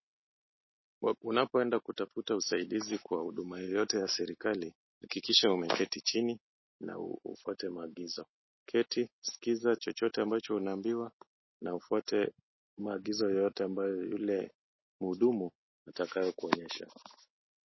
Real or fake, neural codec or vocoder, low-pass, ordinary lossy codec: real; none; 7.2 kHz; MP3, 24 kbps